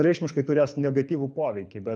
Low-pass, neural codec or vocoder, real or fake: 9.9 kHz; codec, 24 kHz, 6 kbps, HILCodec; fake